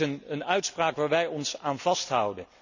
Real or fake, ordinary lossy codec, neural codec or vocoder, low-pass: real; none; none; 7.2 kHz